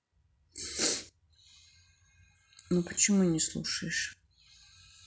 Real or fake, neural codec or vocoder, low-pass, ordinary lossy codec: real; none; none; none